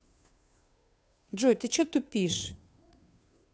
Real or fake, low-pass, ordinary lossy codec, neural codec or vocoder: fake; none; none; codec, 16 kHz, 2 kbps, FunCodec, trained on Chinese and English, 25 frames a second